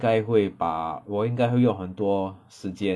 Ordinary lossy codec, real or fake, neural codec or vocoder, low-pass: none; real; none; none